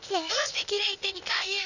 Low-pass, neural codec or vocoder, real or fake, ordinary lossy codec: 7.2 kHz; codec, 16 kHz, 0.8 kbps, ZipCodec; fake; none